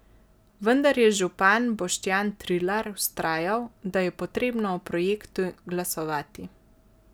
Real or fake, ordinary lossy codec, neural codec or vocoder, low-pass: real; none; none; none